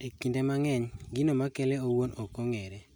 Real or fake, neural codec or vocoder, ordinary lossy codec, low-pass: real; none; none; none